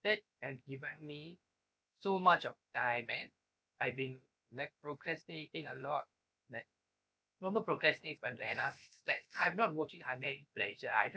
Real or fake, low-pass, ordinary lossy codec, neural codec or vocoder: fake; none; none; codec, 16 kHz, about 1 kbps, DyCAST, with the encoder's durations